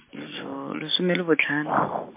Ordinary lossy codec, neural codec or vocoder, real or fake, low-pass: MP3, 24 kbps; none; real; 3.6 kHz